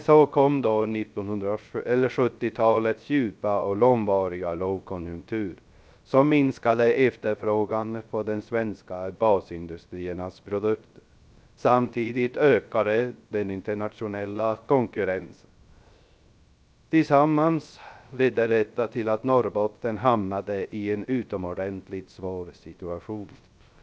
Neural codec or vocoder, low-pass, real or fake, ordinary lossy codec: codec, 16 kHz, 0.3 kbps, FocalCodec; none; fake; none